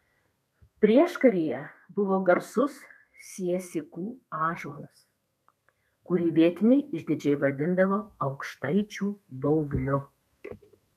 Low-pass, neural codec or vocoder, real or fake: 14.4 kHz; codec, 32 kHz, 1.9 kbps, SNAC; fake